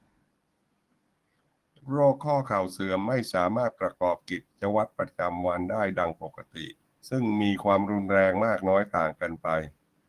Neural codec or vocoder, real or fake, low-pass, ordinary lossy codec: codec, 44.1 kHz, 7.8 kbps, DAC; fake; 14.4 kHz; Opus, 24 kbps